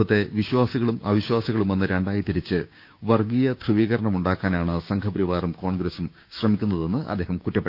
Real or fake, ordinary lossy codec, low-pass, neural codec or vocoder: fake; none; 5.4 kHz; codec, 16 kHz, 6 kbps, DAC